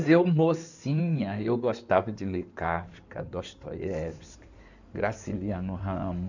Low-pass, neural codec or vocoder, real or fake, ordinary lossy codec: 7.2 kHz; codec, 16 kHz in and 24 kHz out, 2.2 kbps, FireRedTTS-2 codec; fake; none